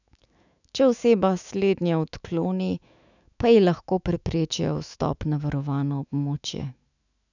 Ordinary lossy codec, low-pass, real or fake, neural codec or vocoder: none; 7.2 kHz; fake; autoencoder, 48 kHz, 128 numbers a frame, DAC-VAE, trained on Japanese speech